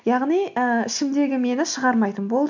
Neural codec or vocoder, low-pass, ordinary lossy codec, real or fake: none; 7.2 kHz; MP3, 64 kbps; real